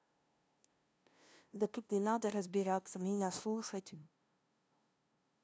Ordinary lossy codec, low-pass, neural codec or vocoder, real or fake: none; none; codec, 16 kHz, 0.5 kbps, FunCodec, trained on LibriTTS, 25 frames a second; fake